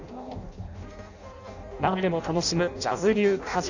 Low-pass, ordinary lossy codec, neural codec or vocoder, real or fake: 7.2 kHz; none; codec, 16 kHz in and 24 kHz out, 0.6 kbps, FireRedTTS-2 codec; fake